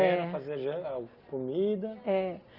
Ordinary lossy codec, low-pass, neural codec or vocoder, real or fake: Opus, 24 kbps; 5.4 kHz; none; real